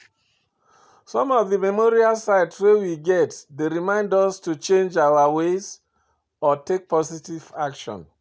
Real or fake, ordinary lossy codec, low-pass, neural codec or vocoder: real; none; none; none